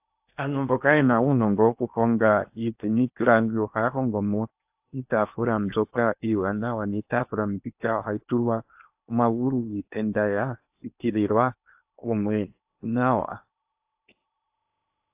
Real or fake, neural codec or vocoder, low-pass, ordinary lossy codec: fake; codec, 16 kHz in and 24 kHz out, 0.8 kbps, FocalCodec, streaming, 65536 codes; 3.6 kHz; AAC, 32 kbps